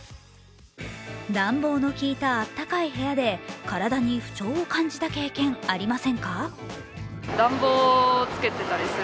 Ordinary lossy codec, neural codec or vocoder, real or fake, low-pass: none; none; real; none